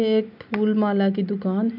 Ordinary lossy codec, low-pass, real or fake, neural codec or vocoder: none; 5.4 kHz; real; none